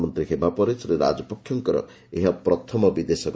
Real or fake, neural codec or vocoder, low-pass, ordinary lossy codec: real; none; none; none